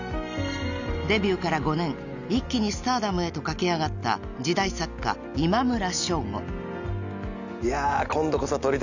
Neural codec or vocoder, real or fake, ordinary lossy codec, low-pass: none; real; none; 7.2 kHz